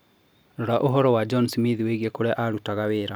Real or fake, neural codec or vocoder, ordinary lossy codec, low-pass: real; none; none; none